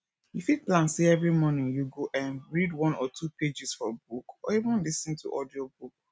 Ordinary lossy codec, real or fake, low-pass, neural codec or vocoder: none; real; none; none